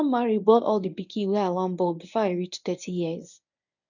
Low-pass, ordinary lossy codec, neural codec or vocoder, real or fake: 7.2 kHz; none; codec, 24 kHz, 0.9 kbps, WavTokenizer, medium speech release version 1; fake